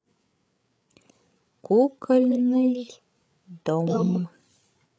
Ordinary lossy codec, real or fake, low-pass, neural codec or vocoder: none; fake; none; codec, 16 kHz, 8 kbps, FreqCodec, larger model